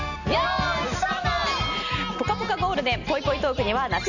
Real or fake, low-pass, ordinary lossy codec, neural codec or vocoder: real; 7.2 kHz; none; none